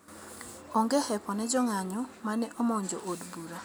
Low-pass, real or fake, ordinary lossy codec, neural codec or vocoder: none; real; none; none